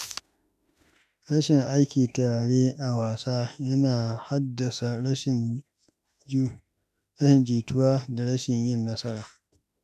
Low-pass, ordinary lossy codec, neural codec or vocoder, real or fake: 14.4 kHz; none; autoencoder, 48 kHz, 32 numbers a frame, DAC-VAE, trained on Japanese speech; fake